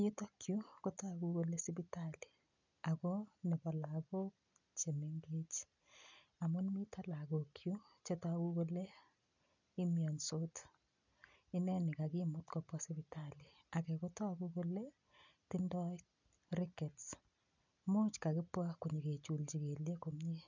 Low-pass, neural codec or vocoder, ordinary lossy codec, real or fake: 7.2 kHz; none; none; real